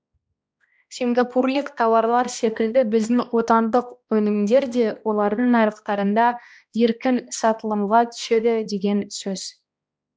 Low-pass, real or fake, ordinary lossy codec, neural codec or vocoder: none; fake; none; codec, 16 kHz, 1 kbps, X-Codec, HuBERT features, trained on balanced general audio